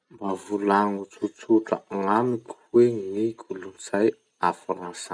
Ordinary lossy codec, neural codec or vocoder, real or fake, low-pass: none; none; real; 9.9 kHz